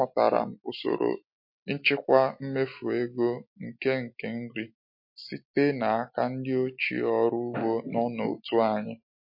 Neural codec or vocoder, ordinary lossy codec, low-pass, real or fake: none; MP3, 32 kbps; 5.4 kHz; real